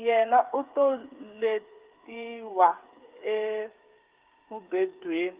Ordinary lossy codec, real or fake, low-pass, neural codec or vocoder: Opus, 32 kbps; fake; 3.6 kHz; codec, 16 kHz, 8 kbps, FreqCodec, smaller model